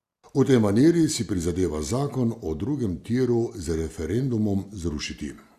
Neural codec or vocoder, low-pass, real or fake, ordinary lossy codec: none; 14.4 kHz; real; Opus, 64 kbps